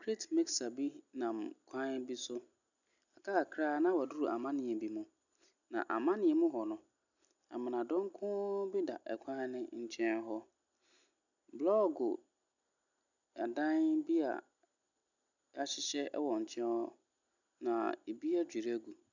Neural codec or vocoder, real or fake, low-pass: none; real; 7.2 kHz